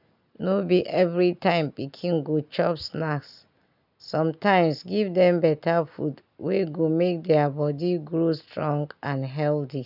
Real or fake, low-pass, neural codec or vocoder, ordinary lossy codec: real; 5.4 kHz; none; none